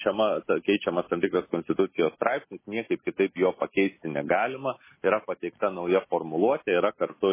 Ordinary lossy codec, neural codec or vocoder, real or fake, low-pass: MP3, 16 kbps; none; real; 3.6 kHz